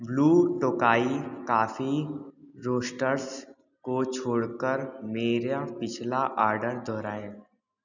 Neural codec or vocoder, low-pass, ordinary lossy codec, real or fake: none; 7.2 kHz; none; real